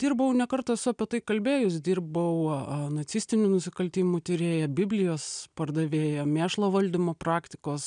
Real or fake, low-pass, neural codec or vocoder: real; 9.9 kHz; none